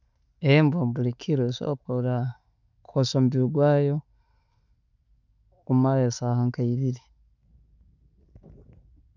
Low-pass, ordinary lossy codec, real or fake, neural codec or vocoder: 7.2 kHz; none; real; none